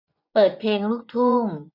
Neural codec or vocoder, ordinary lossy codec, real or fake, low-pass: vocoder, 24 kHz, 100 mel bands, Vocos; none; fake; 5.4 kHz